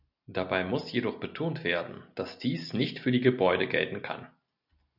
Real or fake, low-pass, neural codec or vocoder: real; 5.4 kHz; none